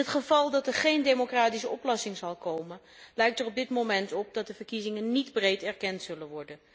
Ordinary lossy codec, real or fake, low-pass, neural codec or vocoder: none; real; none; none